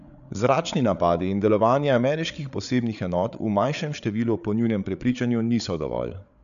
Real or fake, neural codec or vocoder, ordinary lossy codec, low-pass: fake; codec, 16 kHz, 8 kbps, FreqCodec, larger model; none; 7.2 kHz